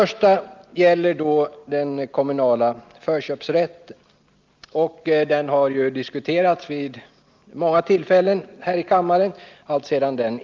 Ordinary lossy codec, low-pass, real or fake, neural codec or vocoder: Opus, 24 kbps; 7.2 kHz; real; none